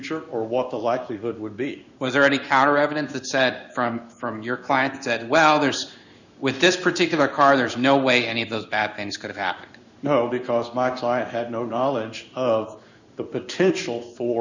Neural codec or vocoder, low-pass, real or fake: codec, 16 kHz in and 24 kHz out, 1 kbps, XY-Tokenizer; 7.2 kHz; fake